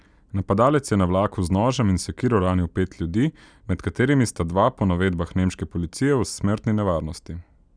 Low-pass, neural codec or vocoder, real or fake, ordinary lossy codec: 9.9 kHz; none; real; none